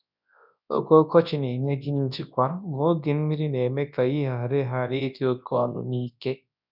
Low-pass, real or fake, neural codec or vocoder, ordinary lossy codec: 5.4 kHz; fake; codec, 24 kHz, 0.9 kbps, WavTokenizer, large speech release; none